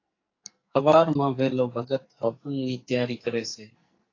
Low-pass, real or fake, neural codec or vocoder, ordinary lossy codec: 7.2 kHz; fake; codec, 44.1 kHz, 2.6 kbps, SNAC; AAC, 32 kbps